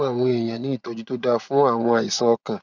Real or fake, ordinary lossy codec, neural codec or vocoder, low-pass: fake; none; vocoder, 24 kHz, 100 mel bands, Vocos; 7.2 kHz